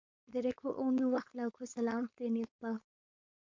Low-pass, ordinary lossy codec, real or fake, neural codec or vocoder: 7.2 kHz; MP3, 48 kbps; fake; codec, 16 kHz, 4.8 kbps, FACodec